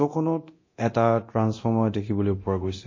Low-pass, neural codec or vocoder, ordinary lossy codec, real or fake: 7.2 kHz; codec, 24 kHz, 0.9 kbps, DualCodec; MP3, 32 kbps; fake